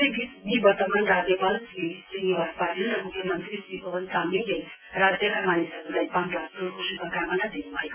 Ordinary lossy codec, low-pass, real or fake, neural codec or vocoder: AAC, 32 kbps; 3.6 kHz; fake; vocoder, 24 kHz, 100 mel bands, Vocos